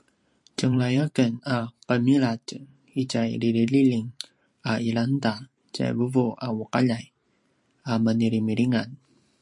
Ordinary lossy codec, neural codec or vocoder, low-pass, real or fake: MP3, 48 kbps; vocoder, 44.1 kHz, 128 mel bands every 512 samples, BigVGAN v2; 10.8 kHz; fake